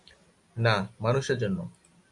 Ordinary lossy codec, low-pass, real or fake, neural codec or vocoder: MP3, 64 kbps; 10.8 kHz; real; none